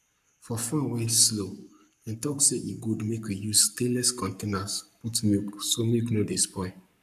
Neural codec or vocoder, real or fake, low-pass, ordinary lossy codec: codec, 44.1 kHz, 7.8 kbps, Pupu-Codec; fake; 14.4 kHz; none